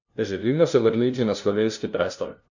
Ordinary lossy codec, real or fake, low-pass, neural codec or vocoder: none; fake; 7.2 kHz; codec, 16 kHz, 0.5 kbps, FunCodec, trained on LibriTTS, 25 frames a second